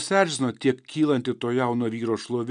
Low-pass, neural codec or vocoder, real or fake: 9.9 kHz; none; real